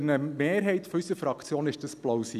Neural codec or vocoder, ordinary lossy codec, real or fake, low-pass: none; none; real; 14.4 kHz